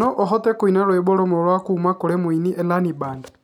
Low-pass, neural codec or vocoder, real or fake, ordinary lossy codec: 19.8 kHz; none; real; none